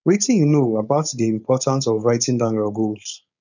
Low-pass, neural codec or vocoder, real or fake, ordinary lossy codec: 7.2 kHz; codec, 16 kHz, 4.8 kbps, FACodec; fake; none